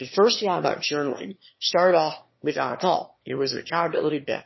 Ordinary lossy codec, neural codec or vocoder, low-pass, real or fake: MP3, 24 kbps; autoencoder, 22.05 kHz, a latent of 192 numbers a frame, VITS, trained on one speaker; 7.2 kHz; fake